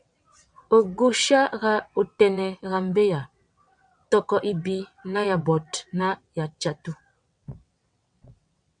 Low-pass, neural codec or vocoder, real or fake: 9.9 kHz; vocoder, 22.05 kHz, 80 mel bands, WaveNeXt; fake